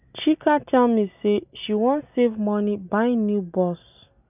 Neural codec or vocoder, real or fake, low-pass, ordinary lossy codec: none; real; 3.6 kHz; none